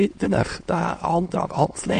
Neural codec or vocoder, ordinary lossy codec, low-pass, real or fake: autoencoder, 22.05 kHz, a latent of 192 numbers a frame, VITS, trained on many speakers; MP3, 48 kbps; 9.9 kHz; fake